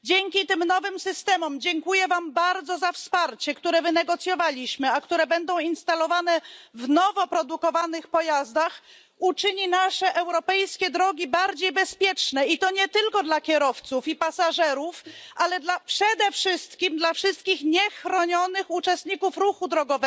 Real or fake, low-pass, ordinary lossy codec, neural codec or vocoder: real; none; none; none